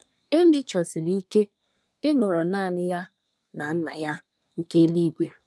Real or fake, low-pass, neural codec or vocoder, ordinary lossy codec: fake; none; codec, 24 kHz, 1 kbps, SNAC; none